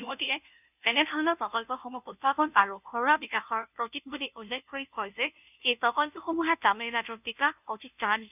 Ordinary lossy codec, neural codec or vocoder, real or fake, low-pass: none; codec, 16 kHz, 0.5 kbps, FunCodec, trained on Chinese and English, 25 frames a second; fake; 3.6 kHz